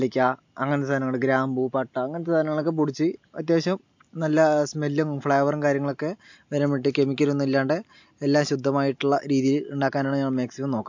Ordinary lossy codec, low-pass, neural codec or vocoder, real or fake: MP3, 48 kbps; 7.2 kHz; none; real